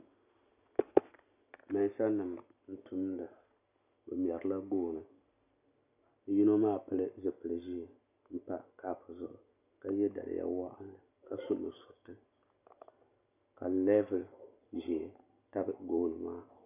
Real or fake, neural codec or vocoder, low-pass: real; none; 3.6 kHz